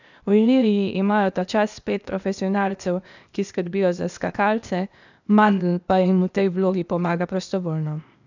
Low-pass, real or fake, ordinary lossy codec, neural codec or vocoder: 7.2 kHz; fake; none; codec, 16 kHz, 0.8 kbps, ZipCodec